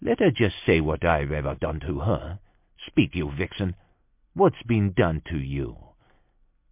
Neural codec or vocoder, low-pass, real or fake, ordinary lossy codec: none; 3.6 kHz; real; MP3, 32 kbps